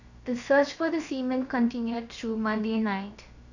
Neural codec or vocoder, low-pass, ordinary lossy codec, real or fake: codec, 16 kHz, 0.7 kbps, FocalCodec; 7.2 kHz; none; fake